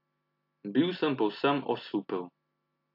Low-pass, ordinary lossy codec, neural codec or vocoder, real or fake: 5.4 kHz; AAC, 48 kbps; none; real